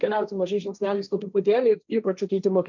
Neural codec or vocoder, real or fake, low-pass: codec, 16 kHz, 1.1 kbps, Voila-Tokenizer; fake; 7.2 kHz